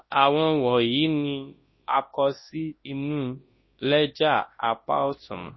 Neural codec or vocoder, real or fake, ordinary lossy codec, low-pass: codec, 24 kHz, 0.9 kbps, WavTokenizer, large speech release; fake; MP3, 24 kbps; 7.2 kHz